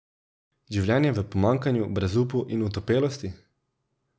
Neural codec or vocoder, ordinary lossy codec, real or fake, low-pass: none; none; real; none